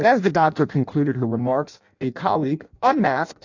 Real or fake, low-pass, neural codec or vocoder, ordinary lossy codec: fake; 7.2 kHz; codec, 16 kHz in and 24 kHz out, 0.6 kbps, FireRedTTS-2 codec; AAC, 48 kbps